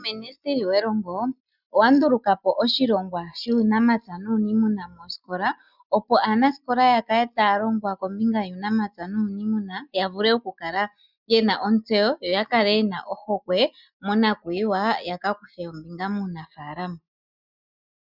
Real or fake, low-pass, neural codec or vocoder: real; 5.4 kHz; none